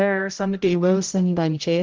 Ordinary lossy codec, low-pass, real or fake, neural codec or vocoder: Opus, 24 kbps; 7.2 kHz; fake; codec, 16 kHz, 0.5 kbps, X-Codec, HuBERT features, trained on general audio